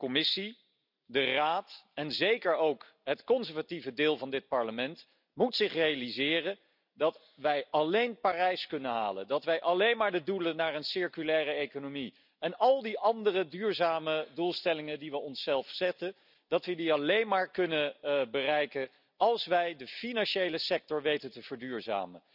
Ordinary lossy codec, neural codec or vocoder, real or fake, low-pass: none; none; real; 5.4 kHz